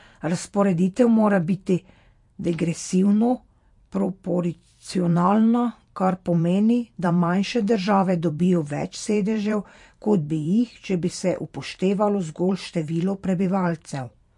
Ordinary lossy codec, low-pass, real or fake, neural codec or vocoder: MP3, 48 kbps; 10.8 kHz; fake; vocoder, 44.1 kHz, 128 mel bands every 512 samples, BigVGAN v2